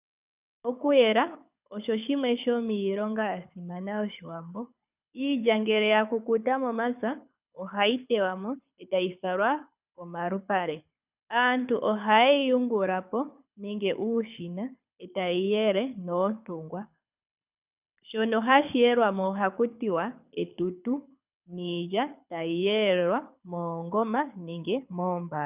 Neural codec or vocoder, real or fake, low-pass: codec, 24 kHz, 6 kbps, HILCodec; fake; 3.6 kHz